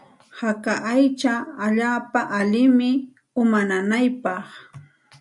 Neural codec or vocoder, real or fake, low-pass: none; real; 10.8 kHz